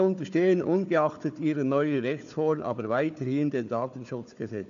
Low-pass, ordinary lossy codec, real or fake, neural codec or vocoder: 7.2 kHz; none; fake; codec, 16 kHz, 4 kbps, FunCodec, trained on Chinese and English, 50 frames a second